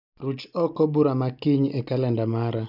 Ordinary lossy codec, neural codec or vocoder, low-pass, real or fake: none; none; 5.4 kHz; real